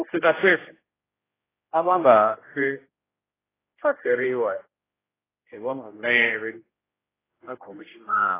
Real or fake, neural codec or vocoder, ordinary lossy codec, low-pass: fake; codec, 16 kHz, 0.5 kbps, X-Codec, HuBERT features, trained on general audio; AAC, 16 kbps; 3.6 kHz